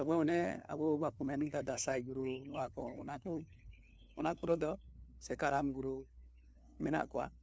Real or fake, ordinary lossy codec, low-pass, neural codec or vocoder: fake; none; none; codec, 16 kHz, 2 kbps, FunCodec, trained on LibriTTS, 25 frames a second